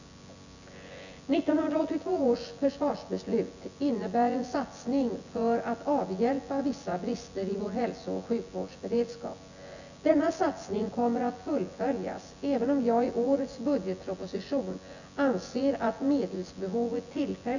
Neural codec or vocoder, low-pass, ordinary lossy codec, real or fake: vocoder, 24 kHz, 100 mel bands, Vocos; 7.2 kHz; none; fake